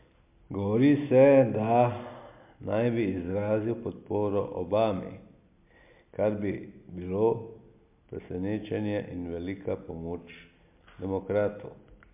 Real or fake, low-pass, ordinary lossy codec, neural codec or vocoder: real; 3.6 kHz; MP3, 32 kbps; none